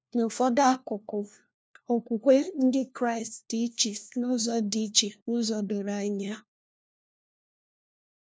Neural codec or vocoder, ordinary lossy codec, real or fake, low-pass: codec, 16 kHz, 1 kbps, FunCodec, trained on LibriTTS, 50 frames a second; none; fake; none